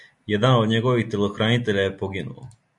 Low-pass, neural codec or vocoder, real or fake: 10.8 kHz; none; real